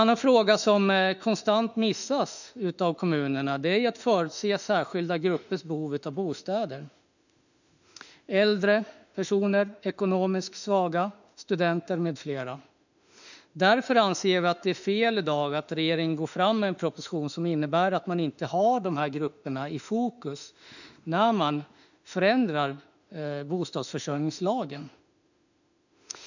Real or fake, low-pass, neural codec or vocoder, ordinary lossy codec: fake; 7.2 kHz; autoencoder, 48 kHz, 32 numbers a frame, DAC-VAE, trained on Japanese speech; none